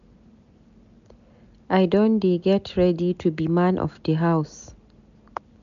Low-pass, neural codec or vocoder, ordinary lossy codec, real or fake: 7.2 kHz; none; MP3, 96 kbps; real